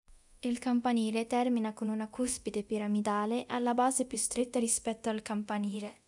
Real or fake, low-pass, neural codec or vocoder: fake; 10.8 kHz; codec, 24 kHz, 0.9 kbps, DualCodec